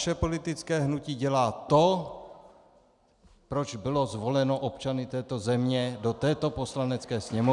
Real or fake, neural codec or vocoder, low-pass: real; none; 10.8 kHz